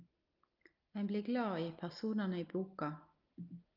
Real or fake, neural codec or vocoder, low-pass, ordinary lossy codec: real; none; 5.4 kHz; Opus, 24 kbps